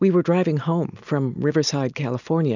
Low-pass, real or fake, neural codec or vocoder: 7.2 kHz; real; none